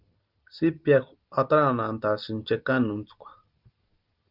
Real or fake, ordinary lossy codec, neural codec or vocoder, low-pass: real; Opus, 32 kbps; none; 5.4 kHz